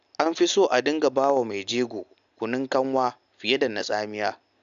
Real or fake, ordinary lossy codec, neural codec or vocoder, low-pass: real; AAC, 96 kbps; none; 7.2 kHz